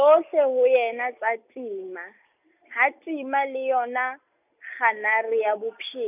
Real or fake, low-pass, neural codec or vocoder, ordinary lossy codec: real; 3.6 kHz; none; none